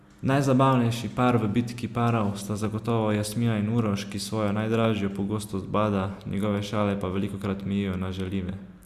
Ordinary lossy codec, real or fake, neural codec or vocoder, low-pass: Opus, 64 kbps; real; none; 14.4 kHz